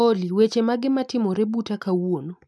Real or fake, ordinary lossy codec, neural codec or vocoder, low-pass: real; none; none; none